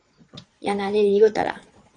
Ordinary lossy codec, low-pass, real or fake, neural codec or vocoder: AAC, 48 kbps; 9.9 kHz; fake; vocoder, 22.05 kHz, 80 mel bands, Vocos